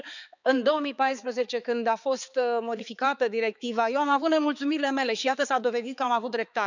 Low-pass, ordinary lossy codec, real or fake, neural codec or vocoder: 7.2 kHz; none; fake; codec, 16 kHz, 4 kbps, X-Codec, HuBERT features, trained on balanced general audio